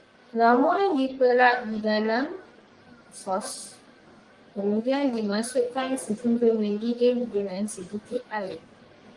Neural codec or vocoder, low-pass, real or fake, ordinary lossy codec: codec, 44.1 kHz, 1.7 kbps, Pupu-Codec; 10.8 kHz; fake; Opus, 24 kbps